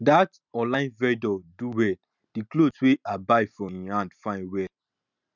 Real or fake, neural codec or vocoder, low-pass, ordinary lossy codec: real; none; 7.2 kHz; none